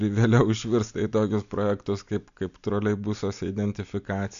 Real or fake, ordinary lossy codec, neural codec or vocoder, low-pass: real; AAC, 96 kbps; none; 7.2 kHz